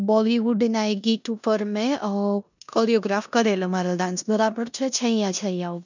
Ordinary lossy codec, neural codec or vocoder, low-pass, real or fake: none; codec, 16 kHz in and 24 kHz out, 0.9 kbps, LongCat-Audio-Codec, four codebook decoder; 7.2 kHz; fake